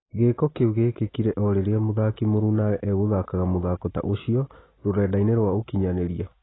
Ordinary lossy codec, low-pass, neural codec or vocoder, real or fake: AAC, 16 kbps; 7.2 kHz; none; real